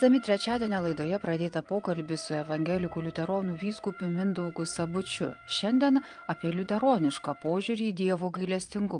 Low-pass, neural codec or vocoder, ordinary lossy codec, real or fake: 9.9 kHz; vocoder, 22.05 kHz, 80 mel bands, Vocos; Opus, 32 kbps; fake